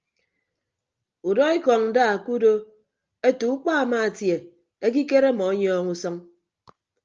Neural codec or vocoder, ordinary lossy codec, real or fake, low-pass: none; Opus, 24 kbps; real; 7.2 kHz